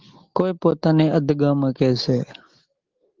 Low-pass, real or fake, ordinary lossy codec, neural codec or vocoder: 7.2 kHz; real; Opus, 16 kbps; none